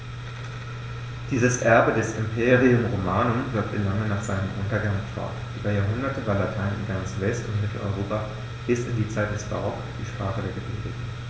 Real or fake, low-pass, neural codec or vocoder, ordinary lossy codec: real; none; none; none